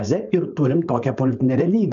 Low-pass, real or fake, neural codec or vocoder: 7.2 kHz; fake; codec, 16 kHz, 4.8 kbps, FACodec